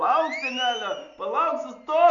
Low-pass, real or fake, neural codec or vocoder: 7.2 kHz; real; none